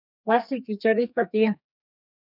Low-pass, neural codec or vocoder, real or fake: 5.4 kHz; codec, 32 kHz, 1.9 kbps, SNAC; fake